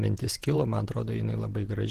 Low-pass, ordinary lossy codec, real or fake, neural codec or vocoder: 14.4 kHz; Opus, 16 kbps; fake; vocoder, 48 kHz, 128 mel bands, Vocos